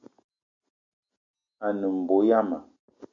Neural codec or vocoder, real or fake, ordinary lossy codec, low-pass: none; real; MP3, 64 kbps; 7.2 kHz